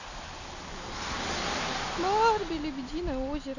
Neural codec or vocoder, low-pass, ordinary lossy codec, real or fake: none; 7.2 kHz; none; real